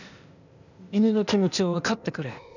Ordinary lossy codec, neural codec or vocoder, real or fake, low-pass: none; codec, 16 kHz, 0.8 kbps, ZipCodec; fake; 7.2 kHz